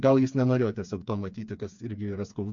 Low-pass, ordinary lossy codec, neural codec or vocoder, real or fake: 7.2 kHz; AAC, 64 kbps; codec, 16 kHz, 4 kbps, FreqCodec, smaller model; fake